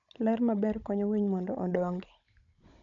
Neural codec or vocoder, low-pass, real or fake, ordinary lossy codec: codec, 16 kHz, 16 kbps, FunCodec, trained on Chinese and English, 50 frames a second; 7.2 kHz; fake; none